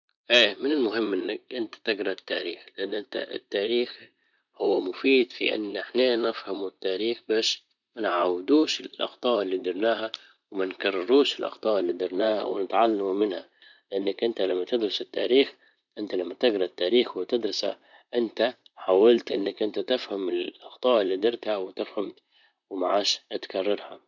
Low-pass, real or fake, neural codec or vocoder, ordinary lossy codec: 7.2 kHz; fake; vocoder, 44.1 kHz, 128 mel bands, Pupu-Vocoder; none